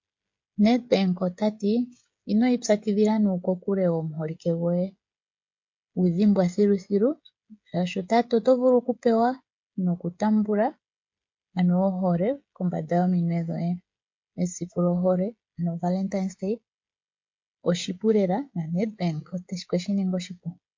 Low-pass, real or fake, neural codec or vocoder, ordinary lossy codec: 7.2 kHz; fake; codec, 16 kHz, 16 kbps, FreqCodec, smaller model; MP3, 48 kbps